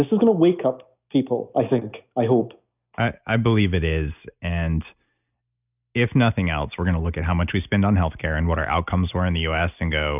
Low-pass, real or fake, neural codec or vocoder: 3.6 kHz; real; none